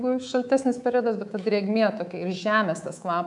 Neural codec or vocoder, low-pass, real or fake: codec, 24 kHz, 3.1 kbps, DualCodec; 10.8 kHz; fake